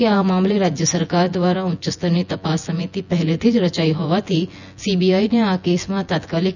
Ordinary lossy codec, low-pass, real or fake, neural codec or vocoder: none; 7.2 kHz; fake; vocoder, 24 kHz, 100 mel bands, Vocos